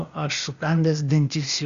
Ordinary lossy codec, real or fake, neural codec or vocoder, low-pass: Opus, 64 kbps; fake; codec, 16 kHz, 0.8 kbps, ZipCodec; 7.2 kHz